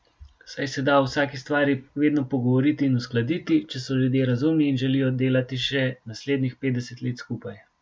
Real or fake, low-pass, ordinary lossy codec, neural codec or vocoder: real; none; none; none